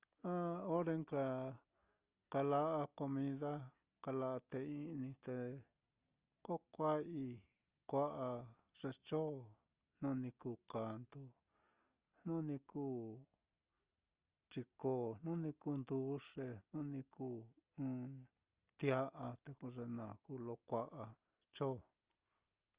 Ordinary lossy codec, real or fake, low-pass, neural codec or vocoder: Opus, 24 kbps; real; 3.6 kHz; none